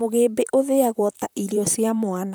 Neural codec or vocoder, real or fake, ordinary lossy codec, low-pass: vocoder, 44.1 kHz, 128 mel bands, Pupu-Vocoder; fake; none; none